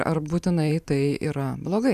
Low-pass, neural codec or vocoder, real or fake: 14.4 kHz; vocoder, 44.1 kHz, 128 mel bands every 512 samples, BigVGAN v2; fake